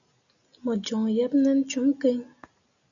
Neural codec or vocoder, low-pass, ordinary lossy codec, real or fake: none; 7.2 kHz; MP3, 96 kbps; real